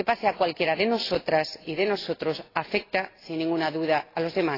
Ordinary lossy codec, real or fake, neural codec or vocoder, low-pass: AAC, 24 kbps; real; none; 5.4 kHz